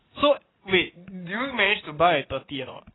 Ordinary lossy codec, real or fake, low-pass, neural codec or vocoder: AAC, 16 kbps; fake; 7.2 kHz; vocoder, 44.1 kHz, 80 mel bands, Vocos